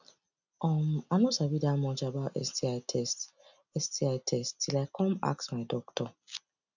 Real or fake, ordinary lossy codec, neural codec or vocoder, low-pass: real; none; none; 7.2 kHz